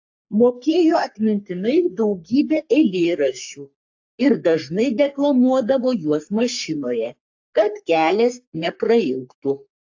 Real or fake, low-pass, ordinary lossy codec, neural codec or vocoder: fake; 7.2 kHz; AAC, 48 kbps; codec, 44.1 kHz, 3.4 kbps, Pupu-Codec